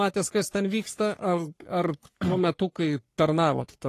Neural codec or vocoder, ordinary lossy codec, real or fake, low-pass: codec, 44.1 kHz, 3.4 kbps, Pupu-Codec; AAC, 48 kbps; fake; 14.4 kHz